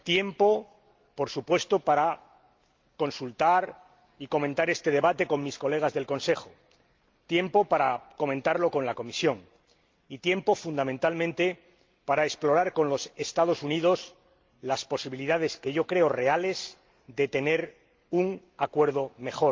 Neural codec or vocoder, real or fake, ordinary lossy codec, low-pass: none; real; Opus, 24 kbps; 7.2 kHz